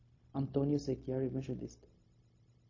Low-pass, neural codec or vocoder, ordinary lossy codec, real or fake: 7.2 kHz; codec, 16 kHz, 0.4 kbps, LongCat-Audio-Codec; MP3, 32 kbps; fake